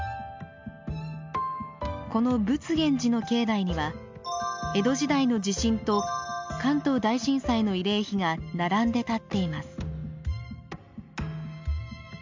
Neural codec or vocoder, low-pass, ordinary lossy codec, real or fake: none; 7.2 kHz; MP3, 64 kbps; real